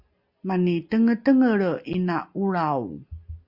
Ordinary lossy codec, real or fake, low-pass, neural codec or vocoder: Opus, 64 kbps; real; 5.4 kHz; none